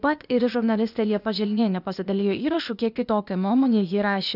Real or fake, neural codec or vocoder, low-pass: fake; codec, 16 kHz, 0.8 kbps, ZipCodec; 5.4 kHz